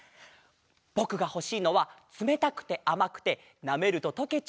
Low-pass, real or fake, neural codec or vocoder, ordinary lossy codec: none; real; none; none